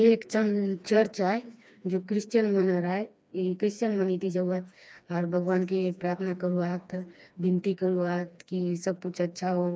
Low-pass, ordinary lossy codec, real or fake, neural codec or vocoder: none; none; fake; codec, 16 kHz, 2 kbps, FreqCodec, smaller model